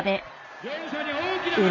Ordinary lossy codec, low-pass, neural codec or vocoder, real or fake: none; 7.2 kHz; none; real